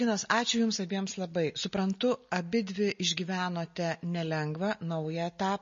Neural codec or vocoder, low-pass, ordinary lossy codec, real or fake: none; 7.2 kHz; MP3, 32 kbps; real